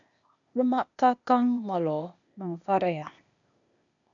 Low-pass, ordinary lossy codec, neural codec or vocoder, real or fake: 7.2 kHz; MP3, 96 kbps; codec, 16 kHz, 0.8 kbps, ZipCodec; fake